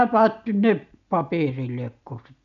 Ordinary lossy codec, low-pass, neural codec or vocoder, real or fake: none; 7.2 kHz; none; real